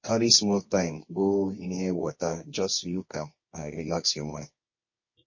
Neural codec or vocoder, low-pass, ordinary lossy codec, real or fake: codec, 24 kHz, 0.9 kbps, WavTokenizer, medium music audio release; 7.2 kHz; MP3, 32 kbps; fake